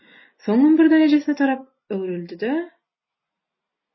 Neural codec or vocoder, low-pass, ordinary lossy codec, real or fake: none; 7.2 kHz; MP3, 24 kbps; real